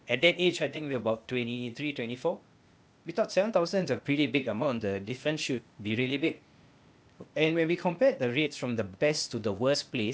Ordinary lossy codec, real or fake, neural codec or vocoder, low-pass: none; fake; codec, 16 kHz, 0.8 kbps, ZipCodec; none